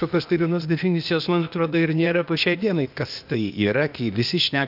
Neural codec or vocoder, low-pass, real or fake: codec, 16 kHz, 0.8 kbps, ZipCodec; 5.4 kHz; fake